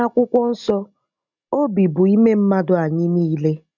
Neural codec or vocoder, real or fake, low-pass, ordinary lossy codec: none; real; 7.2 kHz; none